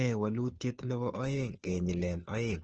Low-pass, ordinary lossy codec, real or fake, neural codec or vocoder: 7.2 kHz; Opus, 16 kbps; fake; codec, 16 kHz, 2 kbps, FunCodec, trained on Chinese and English, 25 frames a second